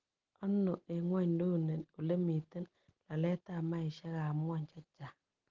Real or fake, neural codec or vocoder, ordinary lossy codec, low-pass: real; none; Opus, 16 kbps; 7.2 kHz